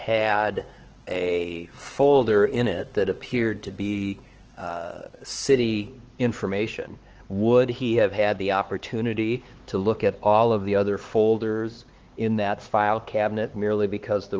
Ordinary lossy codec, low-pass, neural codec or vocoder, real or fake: Opus, 16 kbps; 7.2 kHz; codec, 16 kHz, 4 kbps, X-Codec, HuBERT features, trained on LibriSpeech; fake